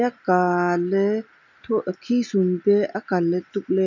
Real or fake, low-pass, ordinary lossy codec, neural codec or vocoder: real; 7.2 kHz; none; none